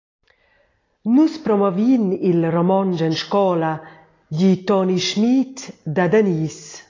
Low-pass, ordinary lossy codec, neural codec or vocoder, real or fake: 7.2 kHz; AAC, 32 kbps; none; real